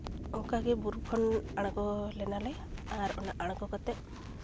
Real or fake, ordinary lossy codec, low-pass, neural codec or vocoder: real; none; none; none